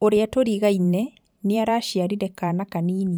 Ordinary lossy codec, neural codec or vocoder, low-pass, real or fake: none; vocoder, 44.1 kHz, 128 mel bands every 512 samples, BigVGAN v2; none; fake